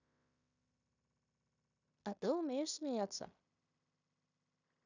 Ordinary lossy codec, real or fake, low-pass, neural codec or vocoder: none; fake; 7.2 kHz; codec, 16 kHz in and 24 kHz out, 0.9 kbps, LongCat-Audio-Codec, fine tuned four codebook decoder